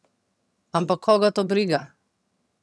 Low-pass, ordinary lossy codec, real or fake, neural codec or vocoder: none; none; fake; vocoder, 22.05 kHz, 80 mel bands, HiFi-GAN